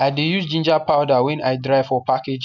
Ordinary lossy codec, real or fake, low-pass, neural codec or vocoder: none; real; 7.2 kHz; none